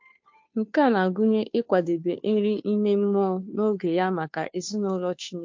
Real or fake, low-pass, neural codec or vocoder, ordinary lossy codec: fake; 7.2 kHz; codec, 16 kHz, 2 kbps, FunCodec, trained on Chinese and English, 25 frames a second; MP3, 48 kbps